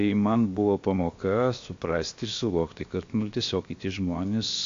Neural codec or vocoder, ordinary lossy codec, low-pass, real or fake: codec, 16 kHz, 0.7 kbps, FocalCodec; AAC, 48 kbps; 7.2 kHz; fake